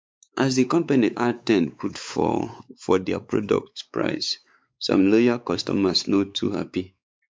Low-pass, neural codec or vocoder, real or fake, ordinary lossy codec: none; codec, 16 kHz, 4 kbps, X-Codec, WavLM features, trained on Multilingual LibriSpeech; fake; none